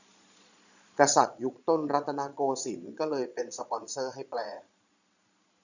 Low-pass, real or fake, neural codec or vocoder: 7.2 kHz; fake; vocoder, 22.05 kHz, 80 mel bands, Vocos